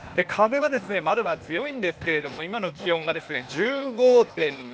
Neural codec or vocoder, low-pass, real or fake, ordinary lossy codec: codec, 16 kHz, 0.8 kbps, ZipCodec; none; fake; none